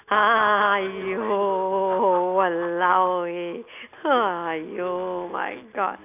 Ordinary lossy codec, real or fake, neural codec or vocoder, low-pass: none; real; none; 3.6 kHz